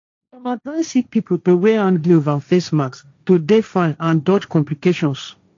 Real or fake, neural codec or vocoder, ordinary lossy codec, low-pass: fake; codec, 16 kHz, 1.1 kbps, Voila-Tokenizer; AAC, 48 kbps; 7.2 kHz